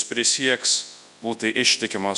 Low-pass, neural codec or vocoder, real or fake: 10.8 kHz; codec, 24 kHz, 0.9 kbps, WavTokenizer, large speech release; fake